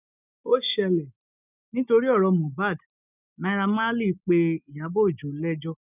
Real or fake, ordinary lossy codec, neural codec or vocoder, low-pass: real; none; none; 3.6 kHz